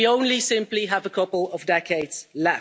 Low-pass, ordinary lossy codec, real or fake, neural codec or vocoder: none; none; real; none